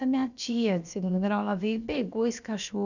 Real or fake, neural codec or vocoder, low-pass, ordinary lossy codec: fake; codec, 16 kHz, about 1 kbps, DyCAST, with the encoder's durations; 7.2 kHz; none